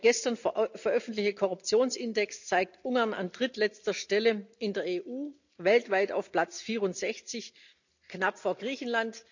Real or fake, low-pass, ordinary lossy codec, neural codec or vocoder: real; 7.2 kHz; none; none